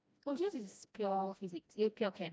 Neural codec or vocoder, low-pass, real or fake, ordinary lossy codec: codec, 16 kHz, 1 kbps, FreqCodec, smaller model; none; fake; none